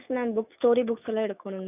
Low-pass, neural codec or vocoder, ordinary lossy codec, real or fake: 3.6 kHz; autoencoder, 48 kHz, 128 numbers a frame, DAC-VAE, trained on Japanese speech; none; fake